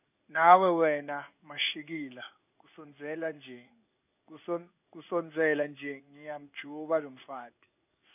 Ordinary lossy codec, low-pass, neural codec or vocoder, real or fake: none; 3.6 kHz; codec, 16 kHz in and 24 kHz out, 1 kbps, XY-Tokenizer; fake